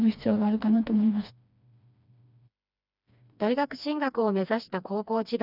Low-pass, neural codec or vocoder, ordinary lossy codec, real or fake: 5.4 kHz; codec, 16 kHz, 2 kbps, FreqCodec, smaller model; none; fake